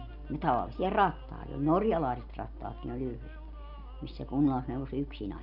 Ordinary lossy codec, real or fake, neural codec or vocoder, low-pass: none; real; none; 5.4 kHz